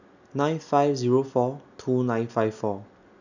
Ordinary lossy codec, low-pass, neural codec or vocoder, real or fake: none; 7.2 kHz; none; real